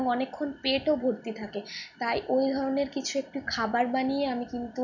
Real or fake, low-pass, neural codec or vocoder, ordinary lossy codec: real; 7.2 kHz; none; none